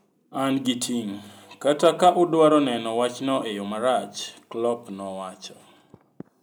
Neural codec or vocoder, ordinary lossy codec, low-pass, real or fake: none; none; none; real